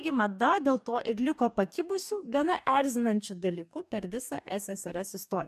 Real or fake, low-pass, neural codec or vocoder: fake; 14.4 kHz; codec, 44.1 kHz, 2.6 kbps, DAC